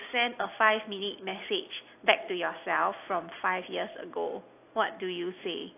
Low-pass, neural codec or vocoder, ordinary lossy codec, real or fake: 3.6 kHz; none; AAC, 24 kbps; real